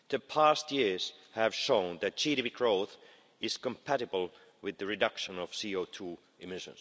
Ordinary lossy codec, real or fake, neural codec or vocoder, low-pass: none; real; none; none